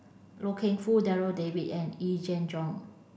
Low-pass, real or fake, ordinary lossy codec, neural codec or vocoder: none; real; none; none